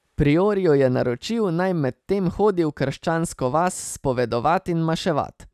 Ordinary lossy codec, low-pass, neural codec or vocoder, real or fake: none; 14.4 kHz; none; real